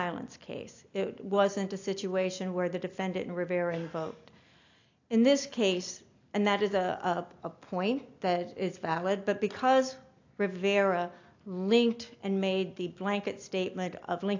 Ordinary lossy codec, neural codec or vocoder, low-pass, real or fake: AAC, 48 kbps; none; 7.2 kHz; real